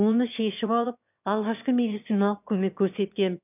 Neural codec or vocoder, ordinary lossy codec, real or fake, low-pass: autoencoder, 22.05 kHz, a latent of 192 numbers a frame, VITS, trained on one speaker; none; fake; 3.6 kHz